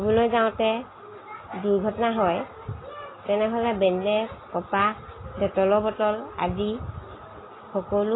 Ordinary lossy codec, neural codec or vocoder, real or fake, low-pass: AAC, 16 kbps; none; real; 7.2 kHz